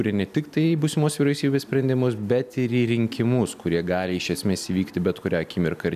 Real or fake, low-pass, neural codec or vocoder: real; 14.4 kHz; none